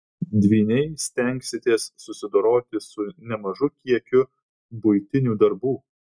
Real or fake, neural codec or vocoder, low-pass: real; none; 9.9 kHz